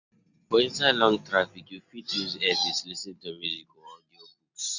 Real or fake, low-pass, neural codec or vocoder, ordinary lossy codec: real; 7.2 kHz; none; none